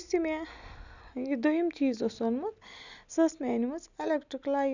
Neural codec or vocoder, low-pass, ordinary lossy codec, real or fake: none; 7.2 kHz; none; real